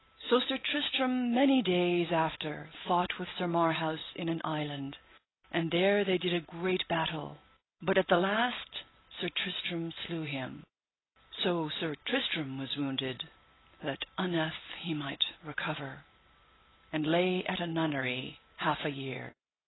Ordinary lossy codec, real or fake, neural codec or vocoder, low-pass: AAC, 16 kbps; real; none; 7.2 kHz